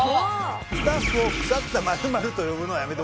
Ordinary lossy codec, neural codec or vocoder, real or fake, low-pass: none; none; real; none